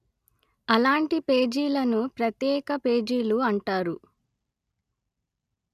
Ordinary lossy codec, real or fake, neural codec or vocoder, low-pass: none; real; none; 14.4 kHz